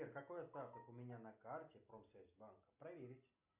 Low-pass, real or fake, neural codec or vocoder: 3.6 kHz; real; none